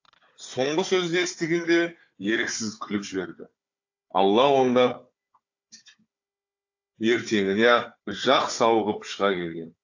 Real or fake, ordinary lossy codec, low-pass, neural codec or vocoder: fake; AAC, 48 kbps; 7.2 kHz; codec, 16 kHz, 4 kbps, FunCodec, trained on Chinese and English, 50 frames a second